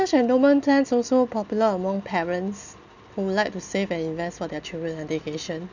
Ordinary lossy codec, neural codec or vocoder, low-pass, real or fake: none; none; 7.2 kHz; real